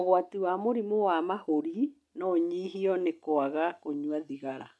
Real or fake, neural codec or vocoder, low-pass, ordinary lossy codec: real; none; none; none